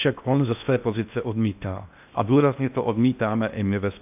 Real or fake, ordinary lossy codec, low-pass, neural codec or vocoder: fake; AAC, 32 kbps; 3.6 kHz; codec, 16 kHz in and 24 kHz out, 0.6 kbps, FocalCodec, streaming, 4096 codes